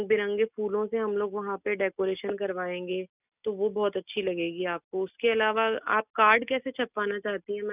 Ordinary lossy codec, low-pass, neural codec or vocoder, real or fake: none; 3.6 kHz; none; real